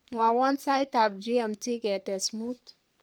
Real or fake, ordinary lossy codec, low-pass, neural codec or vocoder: fake; none; none; codec, 44.1 kHz, 3.4 kbps, Pupu-Codec